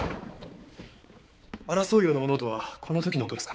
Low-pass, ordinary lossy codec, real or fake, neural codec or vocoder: none; none; fake; codec, 16 kHz, 4 kbps, X-Codec, HuBERT features, trained on balanced general audio